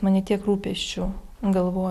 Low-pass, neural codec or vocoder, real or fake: 14.4 kHz; none; real